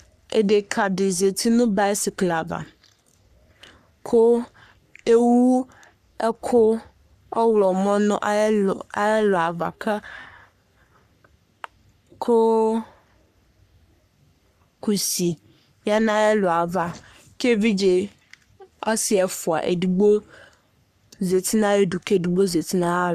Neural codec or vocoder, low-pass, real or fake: codec, 44.1 kHz, 3.4 kbps, Pupu-Codec; 14.4 kHz; fake